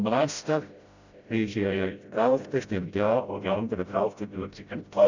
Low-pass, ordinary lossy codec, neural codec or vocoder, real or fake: 7.2 kHz; Opus, 64 kbps; codec, 16 kHz, 0.5 kbps, FreqCodec, smaller model; fake